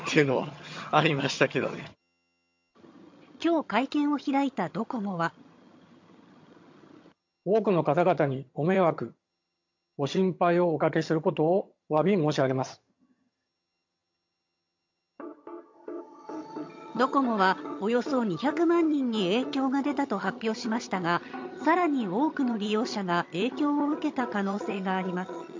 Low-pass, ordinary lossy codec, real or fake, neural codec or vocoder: 7.2 kHz; MP3, 48 kbps; fake; vocoder, 22.05 kHz, 80 mel bands, HiFi-GAN